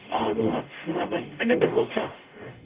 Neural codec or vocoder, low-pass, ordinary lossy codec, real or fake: codec, 44.1 kHz, 0.9 kbps, DAC; 3.6 kHz; Opus, 32 kbps; fake